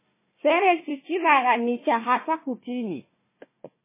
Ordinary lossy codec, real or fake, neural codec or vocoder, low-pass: MP3, 16 kbps; fake; codec, 16 kHz, 1 kbps, FunCodec, trained on Chinese and English, 50 frames a second; 3.6 kHz